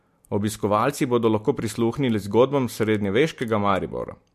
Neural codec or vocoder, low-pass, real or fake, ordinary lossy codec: none; 14.4 kHz; real; MP3, 64 kbps